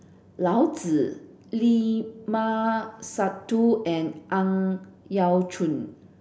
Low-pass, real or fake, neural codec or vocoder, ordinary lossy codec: none; real; none; none